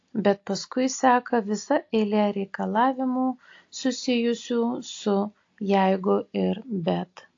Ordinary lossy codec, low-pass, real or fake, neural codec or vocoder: AAC, 48 kbps; 7.2 kHz; real; none